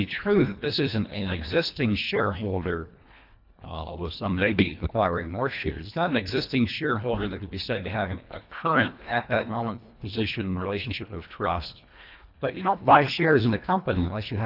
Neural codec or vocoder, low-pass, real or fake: codec, 24 kHz, 1.5 kbps, HILCodec; 5.4 kHz; fake